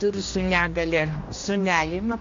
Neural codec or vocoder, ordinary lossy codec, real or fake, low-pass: codec, 16 kHz, 1 kbps, X-Codec, HuBERT features, trained on general audio; AAC, 48 kbps; fake; 7.2 kHz